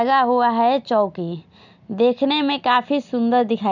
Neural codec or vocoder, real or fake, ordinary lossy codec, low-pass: none; real; none; 7.2 kHz